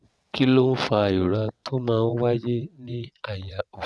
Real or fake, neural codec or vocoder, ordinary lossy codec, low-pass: fake; vocoder, 22.05 kHz, 80 mel bands, WaveNeXt; none; none